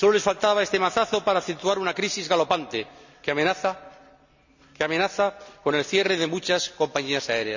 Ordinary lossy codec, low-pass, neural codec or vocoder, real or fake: none; 7.2 kHz; none; real